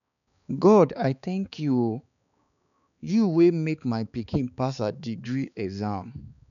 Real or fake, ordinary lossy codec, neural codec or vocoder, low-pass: fake; none; codec, 16 kHz, 4 kbps, X-Codec, HuBERT features, trained on balanced general audio; 7.2 kHz